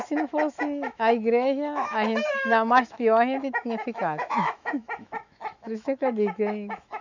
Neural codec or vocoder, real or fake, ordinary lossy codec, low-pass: autoencoder, 48 kHz, 128 numbers a frame, DAC-VAE, trained on Japanese speech; fake; none; 7.2 kHz